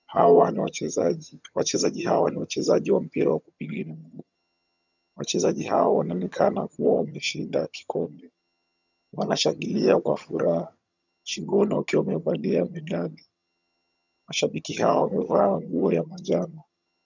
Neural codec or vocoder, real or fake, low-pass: vocoder, 22.05 kHz, 80 mel bands, HiFi-GAN; fake; 7.2 kHz